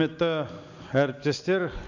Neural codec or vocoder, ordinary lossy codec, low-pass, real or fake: none; none; 7.2 kHz; real